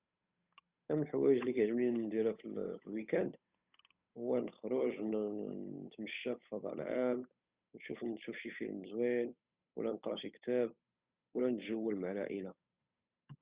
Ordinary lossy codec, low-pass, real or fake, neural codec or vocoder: Opus, 64 kbps; 3.6 kHz; fake; codec, 16 kHz, 8 kbps, FunCodec, trained on Chinese and English, 25 frames a second